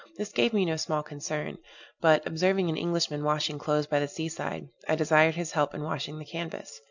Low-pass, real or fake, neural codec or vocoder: 7.2 kHz; real; none